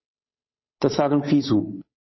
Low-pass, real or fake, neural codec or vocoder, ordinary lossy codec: 7.2 kHz; fake; codec, 16 kHz, 8 kbps, FunCodec, trained on Chinese and English, 25 frames a second; MP3, 24 kbps